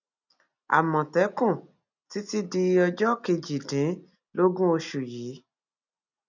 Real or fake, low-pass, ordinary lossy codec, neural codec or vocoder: real; 7.2 kHz; none; none